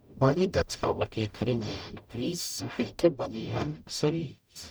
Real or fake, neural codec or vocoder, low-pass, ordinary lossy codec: fake; codec, 44.1 kHz, 0.9 kbps, DAC; none; none